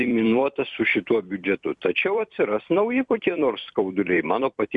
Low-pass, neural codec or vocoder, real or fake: 10.8 kHz; vocoder, 44.1 kHz, 128 mel bands every 256 samples, BigVGAN v2; fake